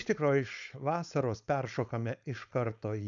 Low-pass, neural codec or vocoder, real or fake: 7.2 kHz; codec, 16 kHz, 4.8 kbps, FACodec; fake